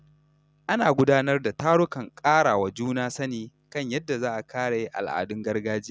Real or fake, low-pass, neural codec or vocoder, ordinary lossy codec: real; none; none; none